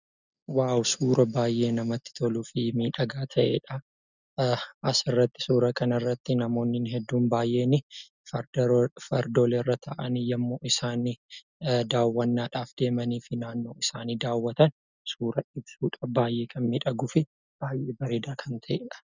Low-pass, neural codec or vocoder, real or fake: 7.2 kHz; none; real